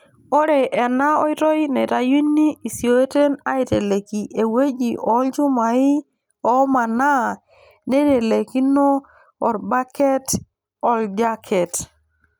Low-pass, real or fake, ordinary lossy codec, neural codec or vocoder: none; real; none; none